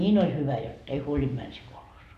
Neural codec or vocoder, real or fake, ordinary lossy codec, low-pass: none; real; none; 14.4 kHz